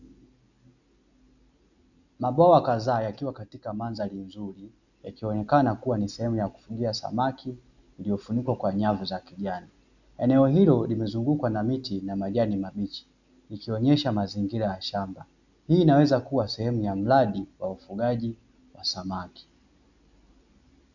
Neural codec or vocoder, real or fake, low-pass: none; real; 7.2 kHz